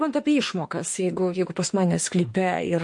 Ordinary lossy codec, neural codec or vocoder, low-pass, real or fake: MP3, 48 kbps; autoencoder, 48 kHz, 32 numbers a frame, DAC-VAE, trained on Japanese speech; 10.8 kHz; fake